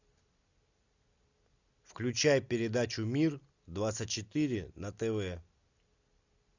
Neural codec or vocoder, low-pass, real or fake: none; 7.2 kHz; real